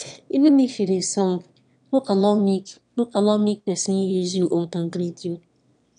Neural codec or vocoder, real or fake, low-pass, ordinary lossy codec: autoencoder, 22.05 kHz, a latent of 192 numbers a frame, VITS, trained on one speaker; fake; 9.9 kHz; none